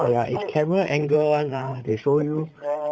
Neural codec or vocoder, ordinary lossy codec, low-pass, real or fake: codec, 16 kHz, 8 kbps, FreqCodec, larger model; none; none; fake